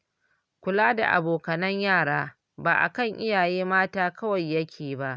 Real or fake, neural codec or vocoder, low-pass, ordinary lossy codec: real; none; none; none